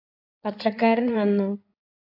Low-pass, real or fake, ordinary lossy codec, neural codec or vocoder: 5.4 kHz; fake; AAC, 48 kbps; codec, 16 kHz in and 24 kHz out, 2.2 kbps, FireRedTTS-2 codec